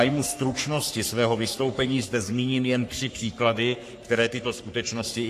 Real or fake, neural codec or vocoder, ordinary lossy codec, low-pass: fake; codec, 44.1 kHz, 3.4 kbps, Pupu-Codec; AAC, 64 kbps; 14.4 kHz